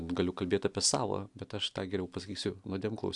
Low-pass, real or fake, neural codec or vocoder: 10.8 kHz; real; none